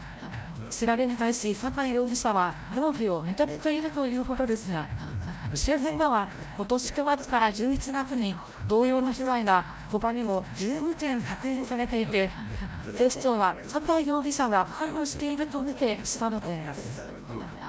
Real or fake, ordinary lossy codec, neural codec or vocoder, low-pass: fake; none; codec, 16 kHz, 0.5 kbps, FreqCodec, larger model; none